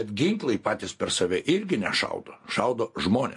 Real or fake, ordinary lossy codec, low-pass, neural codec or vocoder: real; MP3, 48 kbps; 10.8 kHz; none